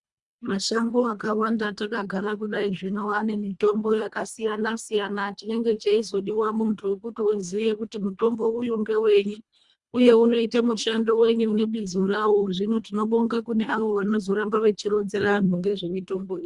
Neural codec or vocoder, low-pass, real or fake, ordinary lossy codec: codec, 24 kHz, 1.5 kbps, HILCodec; 10.8 kHz; fake; Opus, 64 kbps